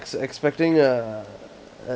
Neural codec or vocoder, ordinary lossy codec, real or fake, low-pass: none; none; real; none